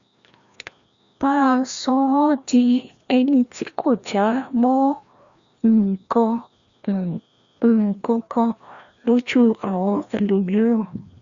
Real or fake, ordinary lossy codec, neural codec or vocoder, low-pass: fake; Opus, 64 kbps; codec, 16 kHz, 1 kbps, FreqCodec, larger model; 7.2 kHz